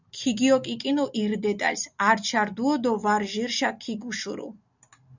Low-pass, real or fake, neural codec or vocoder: 7.2 kHz; real; none